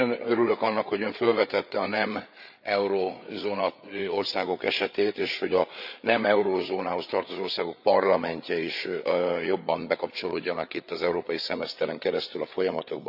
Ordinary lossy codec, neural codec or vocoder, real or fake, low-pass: none; codec, 16 kHz, 8 kbps, FreqCodec, larger model; fake; 5.4 kHz